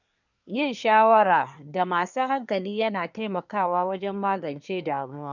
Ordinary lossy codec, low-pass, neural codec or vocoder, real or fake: none; 7.2 kHz; codec, 24 kHz, 1 kbps, SNAC; fake